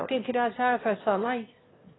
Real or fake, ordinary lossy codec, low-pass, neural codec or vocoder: fake; AAC, 16 kbps; 7.2 kHz; autoencoder, 22.05 kHz, a latent of 192 numbers a frame, VITS, trained on one speaker